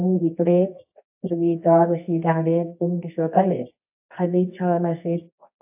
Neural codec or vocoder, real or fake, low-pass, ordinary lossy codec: codec, 24 kHz, 0.9 kbps, WavTokenizer, medium music audio release; fake; 3.6 kHz; AAC, 32 kbps